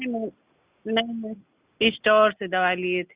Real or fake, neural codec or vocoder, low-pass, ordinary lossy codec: real; none; 3.6 kHz; Opus, 64 kbps